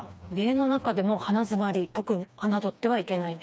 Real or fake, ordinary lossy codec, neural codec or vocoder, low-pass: fake; none; codec, 16 kHz, 2 kbps, FreqCodec, smaller model; none